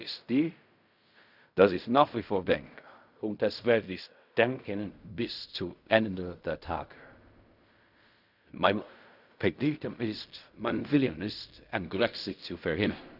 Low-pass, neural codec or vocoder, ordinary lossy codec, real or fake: 5.4 kHz; codec, 16 kHz in and 24 kHz out, 0.4 kbps, LongCat-Audio-Codec, fine tuned four codebook decoder; none; fake